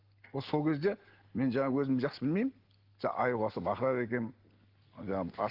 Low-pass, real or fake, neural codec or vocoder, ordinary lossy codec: 5.4 kHz; real; none; Opus, 16 kbps